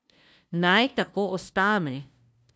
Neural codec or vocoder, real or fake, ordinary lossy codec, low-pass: codec, 16 kHz, 0.5 kbps, FunCodec, trained on LibriTTS, 25 frames a second; fake; none; none